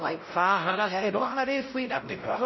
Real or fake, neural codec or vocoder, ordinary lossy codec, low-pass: fake; codec, 16 kHz, 0.5 kbps, X-Codec, WavLM features, trained on Multilingual LibriSpeech; MP3, 24 kbps; 7.2 kHz